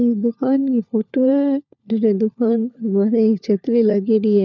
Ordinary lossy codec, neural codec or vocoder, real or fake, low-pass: none; codec, 16 kHz, 4 kbps, FunCodec, trained on LibriTTS, 50 frames a second; fake; 7.2 kHz